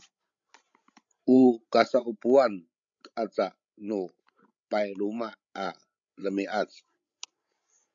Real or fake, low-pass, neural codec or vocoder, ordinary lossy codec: fake; 7.2 kHz; codec, 16 kHz, 16 kbps, FreqCodec, larger model; AAC, 64 kbps